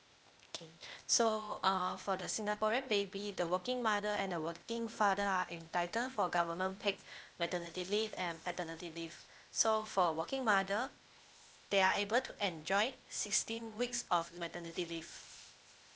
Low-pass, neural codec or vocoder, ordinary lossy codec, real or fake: none; codec, 16 kHz, 0.8 kbps, ZipCodec; none; fake